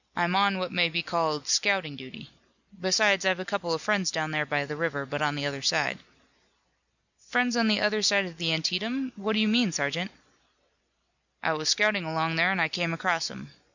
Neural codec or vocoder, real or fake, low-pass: none; real; 7.2 kHz